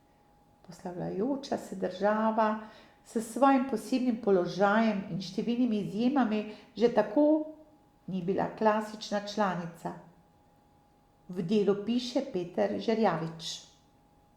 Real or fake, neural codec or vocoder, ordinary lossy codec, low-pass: real; none; Opus, 64 kbps; 19.8 kHz